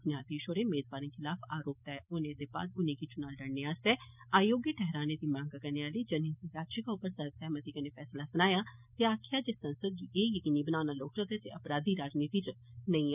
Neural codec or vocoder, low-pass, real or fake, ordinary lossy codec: none; 3.6 kHz; real; none